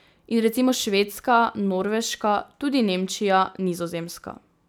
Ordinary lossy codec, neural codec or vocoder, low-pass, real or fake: none; none; none; real